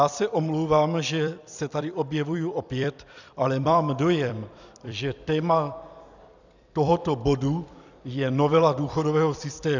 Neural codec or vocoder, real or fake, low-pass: vocoder, 44.1 kHz, 128 mel bands every 256 samples, BigVGAN v2; fake; 7.2 kHz